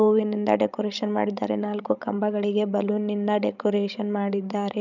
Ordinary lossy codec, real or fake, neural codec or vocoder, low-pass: none; fake; codec, 16 kHz, 16 kbps, FreqCodec, larger model; 7.2 kHz